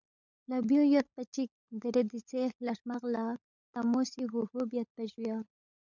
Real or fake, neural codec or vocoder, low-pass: fake; codec, 16 kHz, 16 kbps, FunCodec, trained on LibriTTS, 50 frames a second; 7.2 kHz